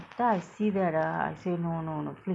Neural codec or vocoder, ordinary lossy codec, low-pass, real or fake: none; none; none; real